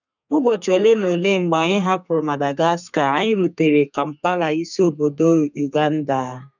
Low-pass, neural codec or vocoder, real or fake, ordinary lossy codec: 7.2 kHz; codec, 32 kHz, 1.9 kbps, SNAC; fake; none